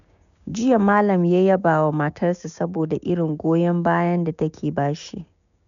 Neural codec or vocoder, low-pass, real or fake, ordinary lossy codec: codec, 16 kHz, 6 kbps, DAC; 7.2 kHz; fake; none